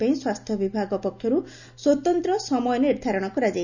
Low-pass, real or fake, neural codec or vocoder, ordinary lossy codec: 7.2 kHz; real; none; none